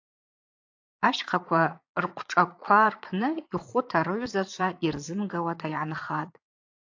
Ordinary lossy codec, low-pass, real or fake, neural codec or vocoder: AAC, 48 kbps; 7.2 kHz; fake; vocoder, 22.05 kHz, 80 mel bands, Vocos